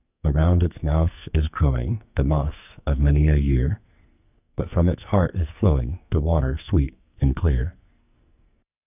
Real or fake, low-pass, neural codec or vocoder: fake; 3.6 kHz; codec, 44.1 kHz, 2.6 kbps, SNAC